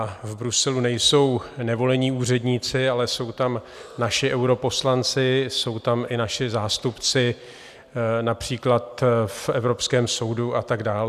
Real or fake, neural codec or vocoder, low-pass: real; none; 14.4 kHz